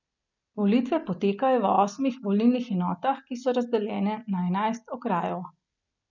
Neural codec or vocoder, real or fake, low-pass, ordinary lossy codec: none; real; 7.2 kHz; none